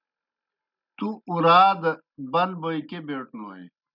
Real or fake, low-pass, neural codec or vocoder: real; 5.4 kHz; none